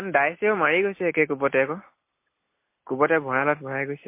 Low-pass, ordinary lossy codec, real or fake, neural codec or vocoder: 3.6 kHz; MP3, 24 kbps; real; none